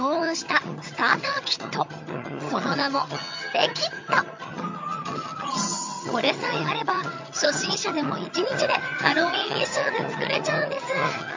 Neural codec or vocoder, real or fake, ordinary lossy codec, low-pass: vocoder, 22.05 kHz, 80 mel bands, HiFi-GAN; fake; MP3, 48 kbps; 7.2 kHz